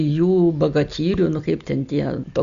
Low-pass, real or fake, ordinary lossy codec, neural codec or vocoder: 7.2 kHz; real; Opus, 64 kbps; none